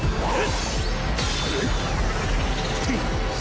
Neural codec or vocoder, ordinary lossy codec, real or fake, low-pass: none; none; real; none